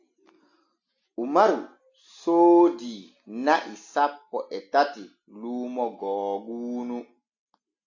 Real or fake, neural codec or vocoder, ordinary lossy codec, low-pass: real; none; AAC, 48 kbps; 7.2 kHz